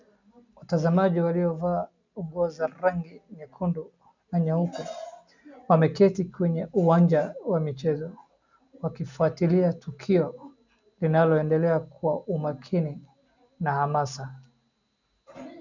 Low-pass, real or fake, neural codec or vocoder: 7.2 kHz; real; none